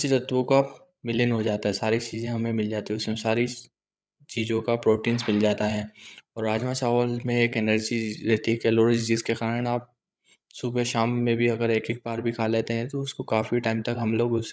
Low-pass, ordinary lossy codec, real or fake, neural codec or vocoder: none; none; fake; codec, 16 kHz, 8 kbps, FreqCodec, larger model